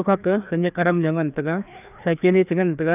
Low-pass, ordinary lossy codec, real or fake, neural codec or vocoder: 3.6 kHz; none; fake; codec, 16 kHz, 2 kbps, FreqCodec, larger model